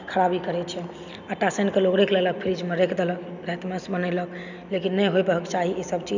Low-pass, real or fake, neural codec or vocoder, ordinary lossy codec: 7.2 kHz; real; none; none